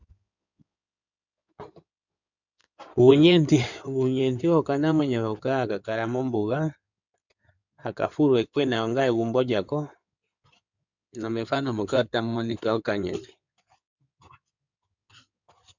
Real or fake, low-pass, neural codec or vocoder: fake; 7.2 kHz; codec, 16 kHz in and 24 kHz out, 2.2 kbps, FireRedTTS-2 codec